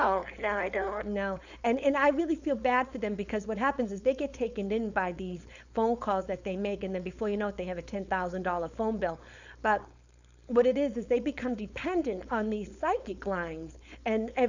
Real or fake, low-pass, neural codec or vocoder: fake; 7.2 kHz; codec, 16 kHz, 4.8 kbps, FACodec